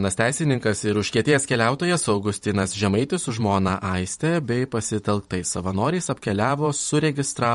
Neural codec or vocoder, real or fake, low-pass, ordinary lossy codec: vocoder, 44.1 kHz, 128 mel bands every 512 samples, BigVGAN v2; fake; 19.8 kHz; MP3, 48 kbps